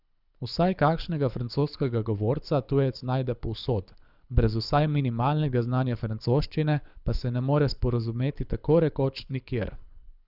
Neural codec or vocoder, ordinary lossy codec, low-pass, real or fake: codec, 24 kHz, 6 kbps, HILCodec; none; 5.4 kHz; fake